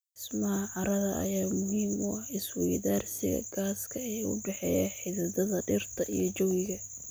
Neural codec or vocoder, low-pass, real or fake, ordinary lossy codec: none; none; real; none